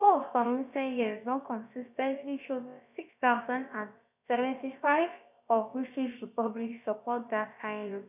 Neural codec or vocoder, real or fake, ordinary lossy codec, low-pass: codec, 16 kHz, about 1 kbps, DyCAST, with the encoder's durations; fake; none; 3.6 kHz